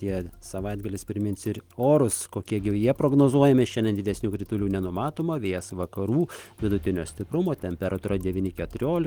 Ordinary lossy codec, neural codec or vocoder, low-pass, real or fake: Opus, 24 kbps; none; 19.8 kHz; real